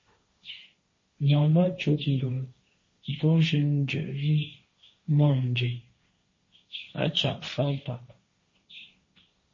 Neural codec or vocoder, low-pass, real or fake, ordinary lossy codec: codec, 16 kHz, 1.1 kbps, Voila-Tokenizer; 7.2 kHz; fake; MP3, 32 kbps